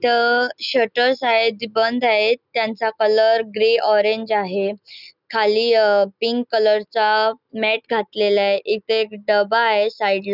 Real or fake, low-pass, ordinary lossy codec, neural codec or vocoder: real; 5.4 kHz; none; none